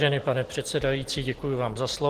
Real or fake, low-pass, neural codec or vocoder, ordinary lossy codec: real; 14.4 kHz; none; Opus, 16 kbps